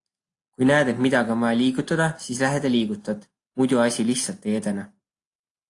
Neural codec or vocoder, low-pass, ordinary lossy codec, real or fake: none; 10.8 kHz; AAC, 48 kbps; real